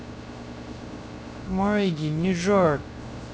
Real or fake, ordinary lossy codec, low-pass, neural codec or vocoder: fake; none; none; codec, 16 kHz, 0.3 kbps, FocalCodec